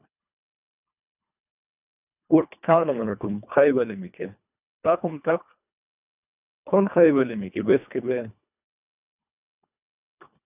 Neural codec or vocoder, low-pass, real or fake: codec, 24 kHz, 1.5 kbps, HILCodec; 3.6 kHz; fake